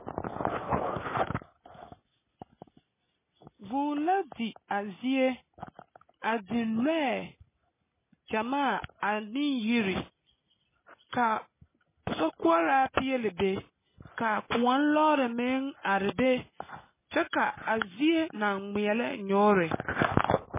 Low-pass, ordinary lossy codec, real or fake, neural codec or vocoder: 3.6 kHz; MP3, 16 kbps; real; none